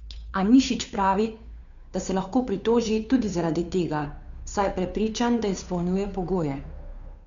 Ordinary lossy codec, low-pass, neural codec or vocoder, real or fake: none; 7.2 kHz; codec, 16 kHz, 2 kbps, FunCodec, trained on Chinese and English, 25 frames a second; fake